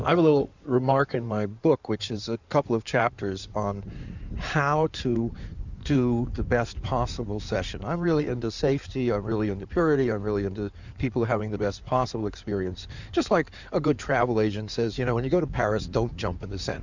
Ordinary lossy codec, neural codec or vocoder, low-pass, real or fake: Opus, 64 kbps; codec, 16 kHz in and 24 kHz out, 2.2 kbps, FireRedTTS-2 codec; 7.2 kHz; fake